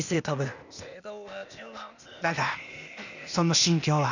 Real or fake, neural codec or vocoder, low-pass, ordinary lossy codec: fake; codec, 16 kHz, 0.8 kbps, ZipCodec; 7.2 kHz; none